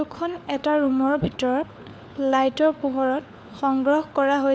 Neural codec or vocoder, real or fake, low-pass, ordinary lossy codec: codec, 16 kHz, 4 kbps, FunCodec, trained on LibriTTS, 50 frames a second; fake; none; none